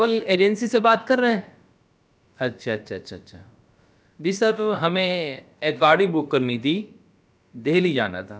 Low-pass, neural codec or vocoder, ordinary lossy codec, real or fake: none; codec, 16 kHz, about 1 kbps, DyCAST, with the encoder's durations; none; fake